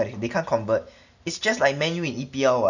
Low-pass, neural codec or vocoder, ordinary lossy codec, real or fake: 7.2 kHz; none; none; real